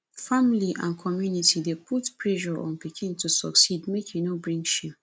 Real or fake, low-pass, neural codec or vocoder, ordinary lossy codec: real; none; none; none